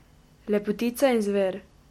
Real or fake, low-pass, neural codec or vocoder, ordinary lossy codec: real; 19.8 kHz; none; MP3, 64 kbps